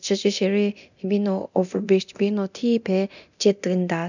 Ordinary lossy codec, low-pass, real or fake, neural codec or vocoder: none; 7.2 kHz; fake; codec, 24 kHz, 0.9 kbps, DualCodec